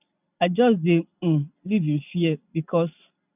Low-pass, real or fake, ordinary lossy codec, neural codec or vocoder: 3.6 kHz; real; none; none